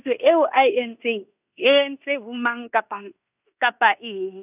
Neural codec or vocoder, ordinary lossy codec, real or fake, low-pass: codec, 16 kHz in and 24 kHz out, 0.9 kbps, LongCat-Audio-Codec, fine tuned four codebook decoder; none; fake; 3.6 kHz